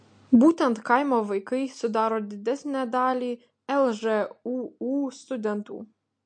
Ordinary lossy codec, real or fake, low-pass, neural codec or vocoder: MP3, 48 kbps; real; 9.9 kHz; none